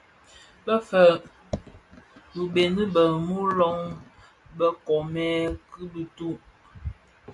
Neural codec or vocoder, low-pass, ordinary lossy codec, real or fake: none; 10.8 kHz; MP3, 96 kbps; real